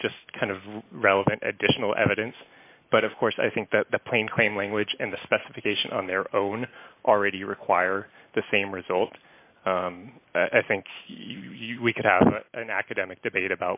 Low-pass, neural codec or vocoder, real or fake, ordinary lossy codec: 3.6 kHz; none; real; AAC, 32 kbps